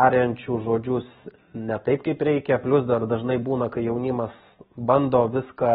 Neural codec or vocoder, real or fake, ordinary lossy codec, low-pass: none; real; AAC, 16 kbps; 7.2 kHz